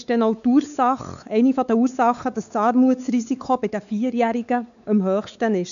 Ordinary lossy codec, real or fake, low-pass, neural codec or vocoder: none; fake; 7.2 kHz; codec, 16 kHz, 4 kbps, X-Codec, WavLM features, trained on Multilingual LibriSpeech